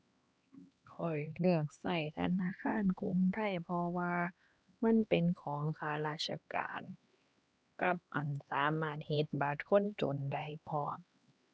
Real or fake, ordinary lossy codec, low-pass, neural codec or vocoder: fake; none; none; codec, 16 kHz, 2 kbps, X-Codec, HuBERT features, trained on LibriSpeech